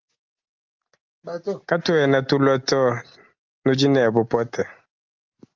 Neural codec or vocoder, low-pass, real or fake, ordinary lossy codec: none; 7.2 kHz; real; Opus, 32 kbps